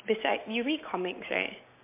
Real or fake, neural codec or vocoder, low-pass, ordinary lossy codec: real; none; 3.6 kHz; MP3, 24 kbps